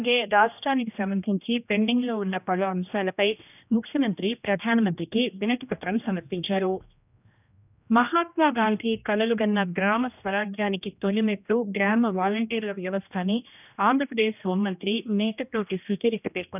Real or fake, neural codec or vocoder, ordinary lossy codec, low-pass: fake; codec, 16 kHz, 1 kbps, X-Codec, HuBERT features, trained on general audio; none; 3.6 kHz